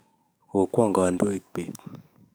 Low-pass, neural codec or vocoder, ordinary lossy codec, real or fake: none; codec, 44.1 kHz, 7.8 kbps, DAC; none; fake